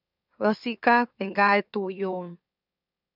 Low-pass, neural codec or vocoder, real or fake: 5.4 kHz; autoencoder, 44.1 kHz, a latent of 192 numbers a frame, MeloTTS; fake